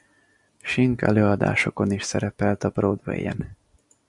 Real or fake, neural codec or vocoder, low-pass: real; none; 10.8 kHz